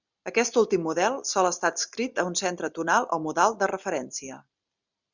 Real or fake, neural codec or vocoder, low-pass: real; none; 7.2 kHz